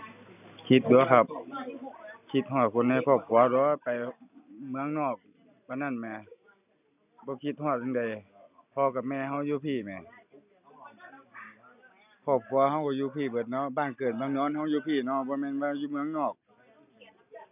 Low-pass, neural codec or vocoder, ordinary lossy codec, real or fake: 3.6 kHz; none; none; real